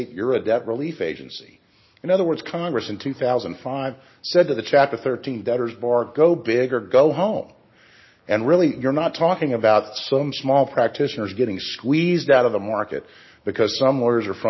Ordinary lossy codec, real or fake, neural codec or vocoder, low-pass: MP3, 24 kbps; real; none; 7.2 kHz